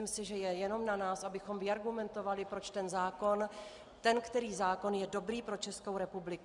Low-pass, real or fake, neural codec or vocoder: 10.8 kHz; real; none